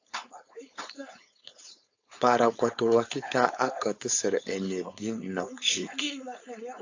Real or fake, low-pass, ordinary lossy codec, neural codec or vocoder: fake; 7.2 kHz; AAC, 48 kbps; codec, 16 kHz, 4.8 kbps, FACodec